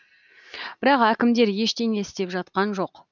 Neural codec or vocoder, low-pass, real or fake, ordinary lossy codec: none; 7.2 kHz; real; none